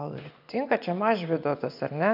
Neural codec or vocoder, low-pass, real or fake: vocoder, 24 kHz, 100 mel bands, Vocos; 5.4 kHz; fake